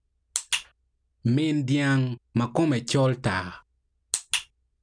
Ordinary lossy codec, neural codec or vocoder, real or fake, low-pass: none; none; real; 9.9 kHz